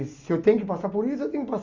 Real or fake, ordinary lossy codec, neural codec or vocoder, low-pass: real; Opus, 64 kbps; none; 7.2 kHz